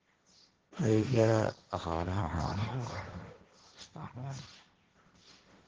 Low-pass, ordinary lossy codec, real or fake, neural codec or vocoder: 7.2 kHz; Opus, 16 kbps; fake; codec, 16 kHz, 1.1 kbps, Voila-Tokenizer